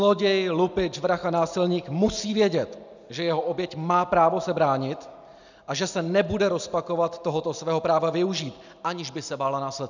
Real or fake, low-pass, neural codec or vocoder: real; 7.2 kHz; none